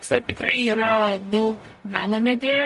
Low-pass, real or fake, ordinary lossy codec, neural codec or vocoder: 14.4 kHz; fake; MP3, 48 kbps; codec, 44.1 kHz, 0.9 kbps, DAC